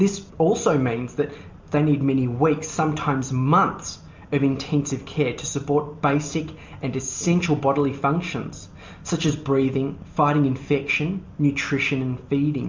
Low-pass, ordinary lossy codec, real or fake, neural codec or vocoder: 7.2 kHz; MP3, 64 kbps; real; none